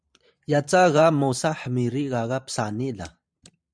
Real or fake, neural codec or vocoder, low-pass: fake; vocoder, 44.1 kHz, 128 mel bands every 512 samples, BigVGAN v2; 9.9 kHz